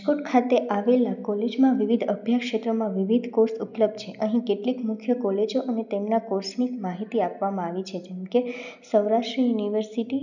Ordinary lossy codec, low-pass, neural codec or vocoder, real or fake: none; 7.2 kHz; none; real